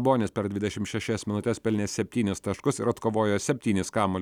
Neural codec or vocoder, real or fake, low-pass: none; real; 19.8 kHz